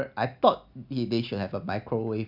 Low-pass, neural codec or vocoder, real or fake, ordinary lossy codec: 5.4 kHz; none; real; none